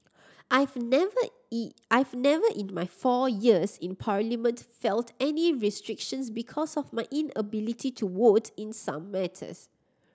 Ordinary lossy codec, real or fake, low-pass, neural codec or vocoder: none; real; none; none